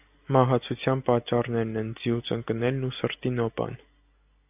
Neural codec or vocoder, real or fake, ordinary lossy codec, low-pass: none; real; AAC, 32 kbps; 3.6 kHz